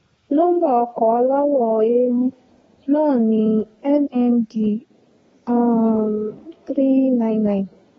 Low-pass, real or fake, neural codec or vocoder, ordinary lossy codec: 14.4 kHz; fake; codec, 32 kHz, 1.9 kbps, SNAC; AAC, 24 kbps